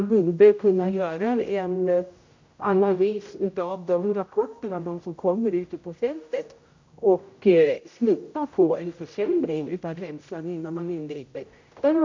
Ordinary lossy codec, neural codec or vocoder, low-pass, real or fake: MP3, 48 kbps; codec, 16 kHz, 0.5 kbps, X-Codec, HuBERT features, trained on general audio; 7.2 kHz; fake